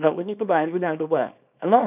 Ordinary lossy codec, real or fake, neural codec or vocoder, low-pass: none; fake; codec, 24 kHz, 0.9 kbps, WavTokenizer, small release; 3.6 kHz